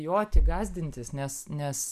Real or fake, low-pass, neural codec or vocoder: real; 14.4 kHz; none